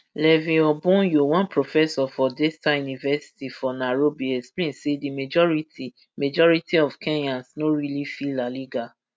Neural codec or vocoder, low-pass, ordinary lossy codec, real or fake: none; none; none; real